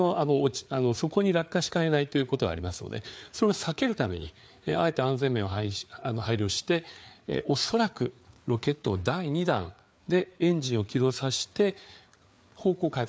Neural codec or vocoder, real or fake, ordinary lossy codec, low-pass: codec, 16 kHz, 4 kbps, FreqCodec, larger model; fake; none; none